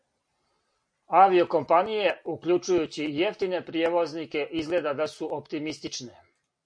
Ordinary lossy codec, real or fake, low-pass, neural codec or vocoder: MP3, 48 kbps; real; 9.9 kHz; none